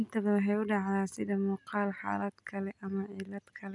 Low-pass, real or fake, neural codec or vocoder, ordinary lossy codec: 10.8 kHz; real; none; none